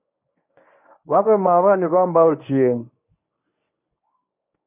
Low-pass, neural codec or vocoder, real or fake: 3.6 kHz; codec, 24 kHz, 0.9 kbps, WavTokenizer, medium speech release version 1; fake